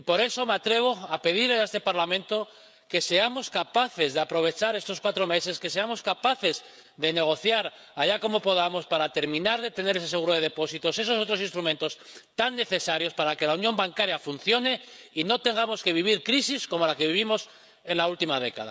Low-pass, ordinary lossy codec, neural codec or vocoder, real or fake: none; none; codec, 16 kHz, 16 kbps, FreqCodec, smaller model; fake